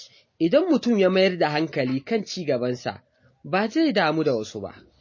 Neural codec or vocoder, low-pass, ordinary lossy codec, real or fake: none; 7.2 kHz; MP3, 32 kbps; real